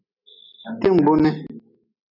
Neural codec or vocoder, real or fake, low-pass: none; real; 5.4 kHz